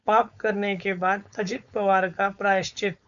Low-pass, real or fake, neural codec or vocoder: 7.2 kHz; fake; codec, 16 kHz, 4.8 kbps, FACodec